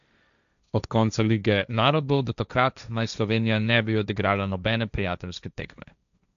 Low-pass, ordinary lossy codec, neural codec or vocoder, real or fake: 7.2 kHz; none; codec, 16 kHz, 1.1 kbps, Voila-Tokenizer; fake